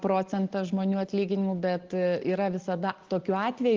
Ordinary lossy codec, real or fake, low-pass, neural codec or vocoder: Opus, 16 kbps; real; 7.2 kHz; none